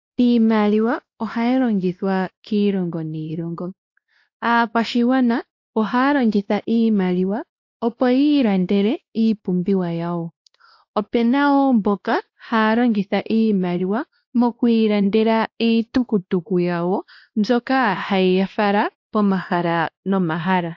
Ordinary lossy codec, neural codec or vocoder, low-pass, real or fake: AAC, 48 kbps; codec, 16 kHz, 1 kbps, X-Codec, WavLM features, trained on Multilingual LibriSpeech; 7.2 kHz; fake